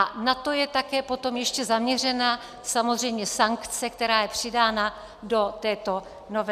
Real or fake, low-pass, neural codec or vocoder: real; 14.4 kHz; none